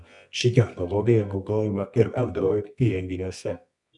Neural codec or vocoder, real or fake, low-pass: codec, 24 kHz, 0.9 kbps, WavTokenizer, medium music audio release; fake; 10.8 kHz